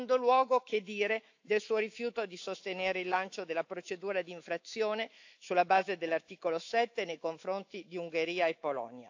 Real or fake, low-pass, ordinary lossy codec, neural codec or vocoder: fake; 7.2 kHz; AAC, 48 kbps; autoencoder, 48 kHz, 128 numbers a frame, DAC-VAE, trained on Japanese speech